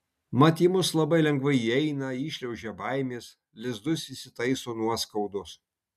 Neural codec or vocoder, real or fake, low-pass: none; real; 14.4 kHz